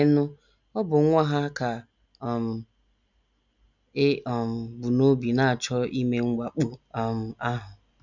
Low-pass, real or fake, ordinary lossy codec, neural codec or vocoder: 7.2 kHz; real; none; none